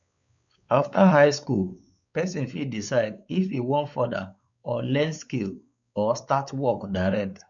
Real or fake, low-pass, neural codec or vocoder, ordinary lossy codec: fake; 7.2 kHz; codec, 16 kHz, 4 kbps, X-Codec, WavLM features, trained on Multilingual LibriSpeech; none